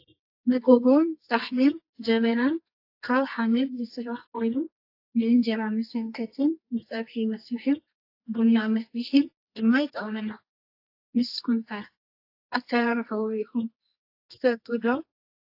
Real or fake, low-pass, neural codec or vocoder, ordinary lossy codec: fake; 5.4 kHz; codec, 24 kHz, 0.9 kbps, WavTokenizer, medium music audio release; AAC, 32 kbps